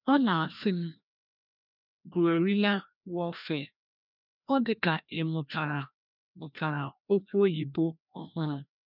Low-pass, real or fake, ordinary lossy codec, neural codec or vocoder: 5.4 kHz; fake; none; codec, 16 kHz, 1 kbps, FreqCodec, larger model